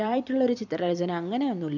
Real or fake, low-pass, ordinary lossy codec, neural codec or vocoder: fake; 7.2 kHz; none; vocoder, 44.1 kHz, 128 mel bands every 256 samples, BigVGAN v2